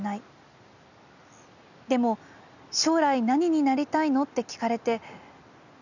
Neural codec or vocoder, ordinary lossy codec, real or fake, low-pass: none; none; real; 7.2 kHz